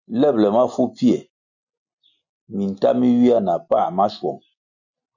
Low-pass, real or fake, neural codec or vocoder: 7.2 kHz; real; none